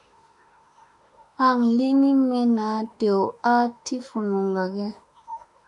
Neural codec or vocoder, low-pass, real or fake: autoencoder, 48 kHz, 32 numbers a frame, DAC-VAE, trained on Japanese speech; 10.8 kHz; fake